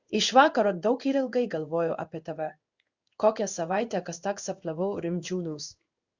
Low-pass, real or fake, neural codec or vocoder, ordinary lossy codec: 7.2 kHz; fake; codec, 16 kHz in and 24 kHz out, 1 kbps, XY-Tokenizer; Opus, 64 kbps